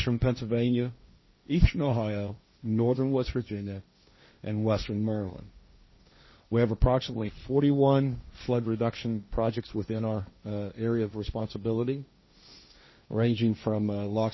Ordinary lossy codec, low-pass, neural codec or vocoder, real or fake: MP3, 24 kbps; 7.2 kHz; codec, 16 kHz, 1.1 kbps, Voila-Tokenizer; fake